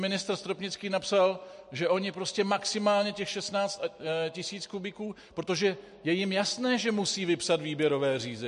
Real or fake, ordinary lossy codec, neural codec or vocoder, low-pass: real; MP3, 48 kbps; none; 14.4 kHz